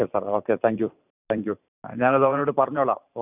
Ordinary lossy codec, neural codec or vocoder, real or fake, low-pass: none; vocoder, 44.1 kHz, 128 mel bands every 512 samples, BigVGAN v2; fake; 3.6 kHz